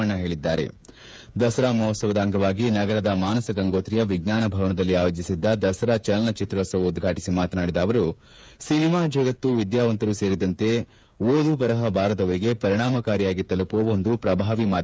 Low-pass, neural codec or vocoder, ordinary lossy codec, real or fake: none; codec, 16 kHz, 8 kbps, FreqCodec, smaller model; none; fake